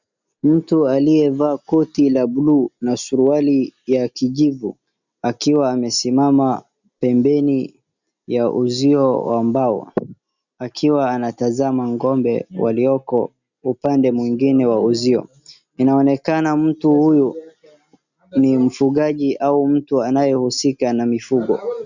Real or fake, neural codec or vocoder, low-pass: real; none; 7.2 kHz